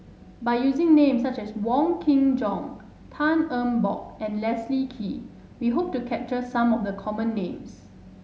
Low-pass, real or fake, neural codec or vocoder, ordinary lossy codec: none; real; none; none